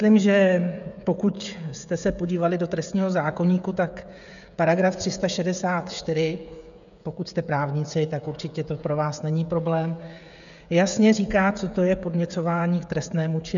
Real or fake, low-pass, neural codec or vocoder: fake; 7.2 kHz; codec, 16 kHz, 16 kbps, FreqCodec, smaller model